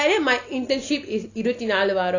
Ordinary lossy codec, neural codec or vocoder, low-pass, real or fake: none; none; 7.2 kHz; real